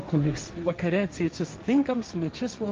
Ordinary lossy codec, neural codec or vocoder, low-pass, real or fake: Opus, 32 kbps; codec, 16 kHz, 1.1 kbps, Voila-Tokenizer; 7.2 kHz; fake